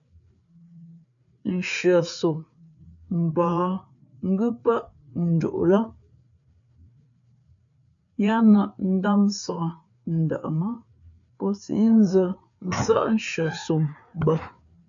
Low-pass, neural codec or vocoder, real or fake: 7.2 kHz; codec, 16 kHz, 4 kbps, FreqCodec, larger model; fake